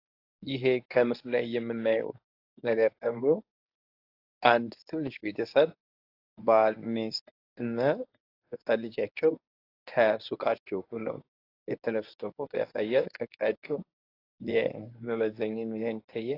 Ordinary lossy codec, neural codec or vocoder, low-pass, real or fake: AAC, 32 kbps; codec, 24 kHz, 0.9 kbps, WavTokenizer, medium speech release version 1; 5.4 kHz; fake